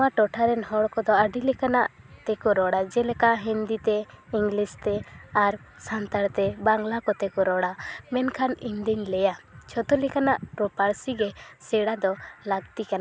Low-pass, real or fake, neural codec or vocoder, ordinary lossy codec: none; real; none; none